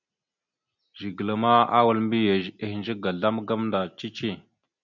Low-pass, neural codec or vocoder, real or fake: 7.2 kHz; none; real